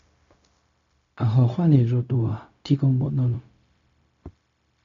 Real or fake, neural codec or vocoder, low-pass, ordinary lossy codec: fake; codec, 16 kHz, 0.4 kbps, LongCat-Audio-Codec; 7.2 kHz; MP3, 64 kbps